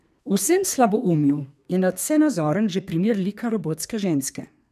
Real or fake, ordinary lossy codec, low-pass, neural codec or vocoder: fake; none; 14.4 kHz; codec, 32 kHz, 1.9 kbps, SNAC